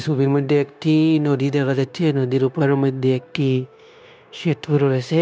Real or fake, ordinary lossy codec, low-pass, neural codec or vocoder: fake; none; none; codec, 16 kHz, 0.9 kbps, LongCat-Audio-Codec